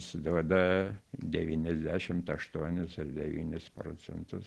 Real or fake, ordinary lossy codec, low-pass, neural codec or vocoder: real; Opus, 16 kbps; 10.8 kHz; none